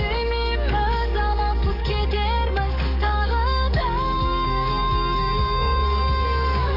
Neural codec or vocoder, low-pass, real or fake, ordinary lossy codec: codec, 44.1 kHz, 7.8 kbps, DAC; 5.4 kHz; fake; none